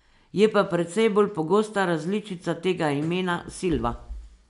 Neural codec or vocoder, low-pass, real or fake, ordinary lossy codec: none; 10.8 kHz; real; MP3, 64 kbps